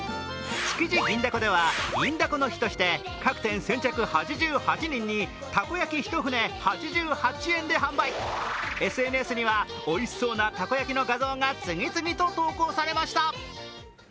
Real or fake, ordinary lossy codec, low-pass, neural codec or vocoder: real; none; none; none